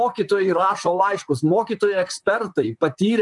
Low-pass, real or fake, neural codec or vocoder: 10.8 kHz; fake; vocoder, 44.1 kHz, 128 mel bands, Pupu-Vocoder